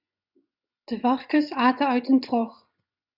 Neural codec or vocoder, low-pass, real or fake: vocoder, 24 kHz, 100 mel bands, Vocos; 5.4 kHz; fake